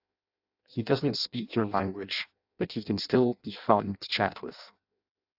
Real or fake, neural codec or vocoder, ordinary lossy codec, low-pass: fake; codec, 16 kHz in and 24 kHz out, 0.6 kbps, FireRedTTS-2 codec; AAC, 48 kbps; 5.4 kHz